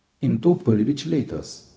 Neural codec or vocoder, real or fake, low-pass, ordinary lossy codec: codec, 16 kHz, 0.4 kbps, LongCat-Audio-Codec; fake; none; none